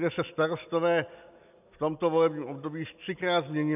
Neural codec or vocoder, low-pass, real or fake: none; 3.6 kHz; real